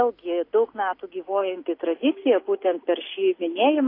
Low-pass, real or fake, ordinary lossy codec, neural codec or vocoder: 5.4 kHz; real; AAC, 32 kbps; none